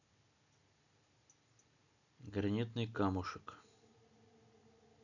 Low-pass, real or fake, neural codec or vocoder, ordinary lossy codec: 7.2 kHz; real; none; none